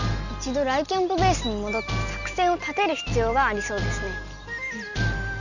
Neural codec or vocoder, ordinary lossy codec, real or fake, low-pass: none; none; real; 7.2 kHz